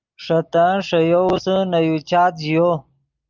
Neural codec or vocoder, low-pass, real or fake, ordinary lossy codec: none; 7.2 kHz; real; Opus, 24 kbps